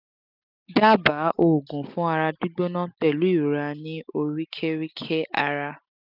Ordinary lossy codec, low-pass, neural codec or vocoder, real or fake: none; 5.4 kHz; none; real